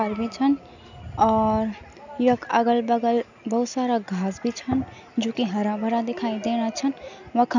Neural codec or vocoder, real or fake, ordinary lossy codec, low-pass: none; real; none; 7.2 kHz